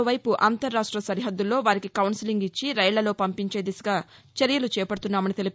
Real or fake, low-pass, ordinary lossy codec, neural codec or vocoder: real; none; none; none